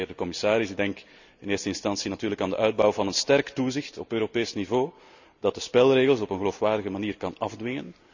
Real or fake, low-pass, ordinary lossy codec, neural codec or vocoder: real; 7.2 kHz; none; none